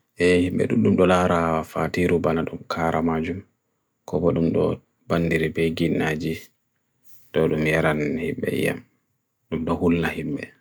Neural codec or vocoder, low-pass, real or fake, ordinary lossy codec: none; none; real; none